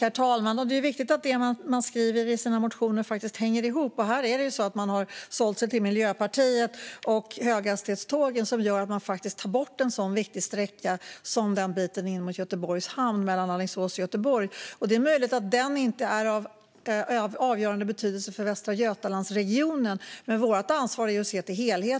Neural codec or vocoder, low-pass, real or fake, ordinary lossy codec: none; none; real; none